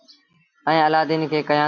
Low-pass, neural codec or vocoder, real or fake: 7.2 kHz; none; real